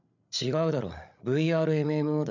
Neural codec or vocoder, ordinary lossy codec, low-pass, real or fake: codec, 16 kHz, 8 kbps, FreqCodec, larger model; none; 7.2 kHz; fake